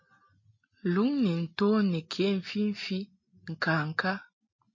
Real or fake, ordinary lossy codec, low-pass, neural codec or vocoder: real; MP3, 32 kbps; 7.2 kHz; none